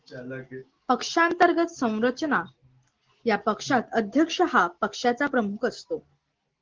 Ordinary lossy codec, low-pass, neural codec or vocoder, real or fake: Opus, 16 kbps; 7.2 kHz; none; real